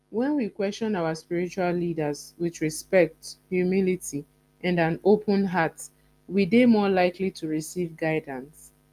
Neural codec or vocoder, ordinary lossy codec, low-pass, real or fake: none; Opus, 32 kbps; 14.4 kHz; real